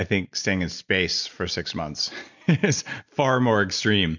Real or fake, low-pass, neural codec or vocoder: real; 7.2 kHz; none